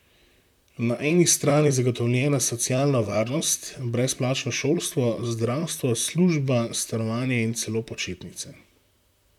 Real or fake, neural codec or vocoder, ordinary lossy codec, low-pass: fake; vocoder, 44.1 kHz, 128 mel bands, Pupu-Vocoder; none; 19.8 kHz